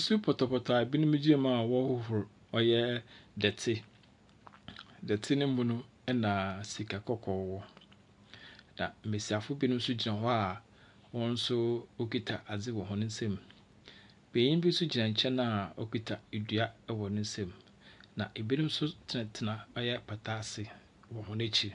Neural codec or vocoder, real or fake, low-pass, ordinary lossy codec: none; real; 10.8 kHz; MP3, 64 kbps